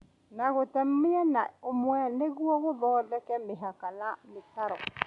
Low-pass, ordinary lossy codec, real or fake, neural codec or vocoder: 10.8 kHz; none; real; none